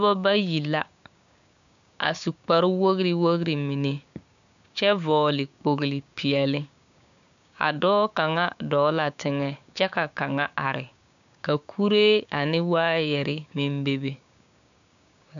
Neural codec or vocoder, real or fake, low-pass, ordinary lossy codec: codec, 16 kHz, 6 kbps, DAC; fake; 7.2 kHz; AAC, 96 kbps